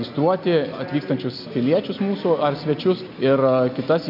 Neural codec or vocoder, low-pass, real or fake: none; 5.4 kHz; real